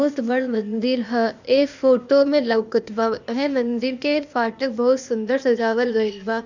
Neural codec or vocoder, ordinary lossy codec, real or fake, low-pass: codec, 16 kHz, 0.8 kbps, ZipCodec; none; fake; 7.2 kHz